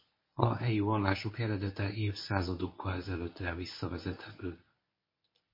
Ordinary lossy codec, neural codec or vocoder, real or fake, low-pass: MP3, 24 kbps; codec, 24 kHz, 0.9 kbps, WavTokenizer, medium speech release version 1; fake; 5.4 kHz